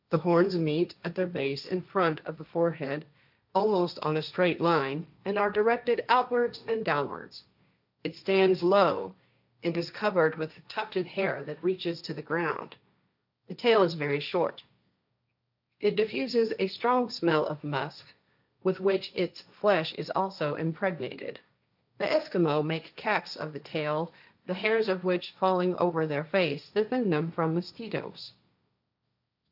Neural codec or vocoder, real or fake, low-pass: codec, 16 kHz, 1.1 kbps, Voila-Tokenizer; fake; 5.4 kHz